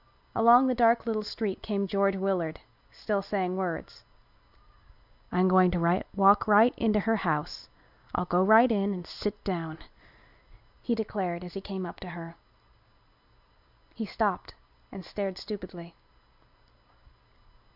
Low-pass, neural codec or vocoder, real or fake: 5.4 kHz; none; real